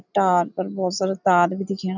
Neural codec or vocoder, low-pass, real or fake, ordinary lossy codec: none; 7.2 kHz; real; none